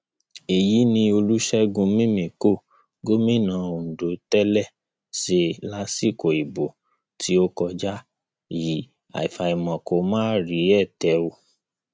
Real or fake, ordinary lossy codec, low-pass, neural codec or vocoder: real; none; none; none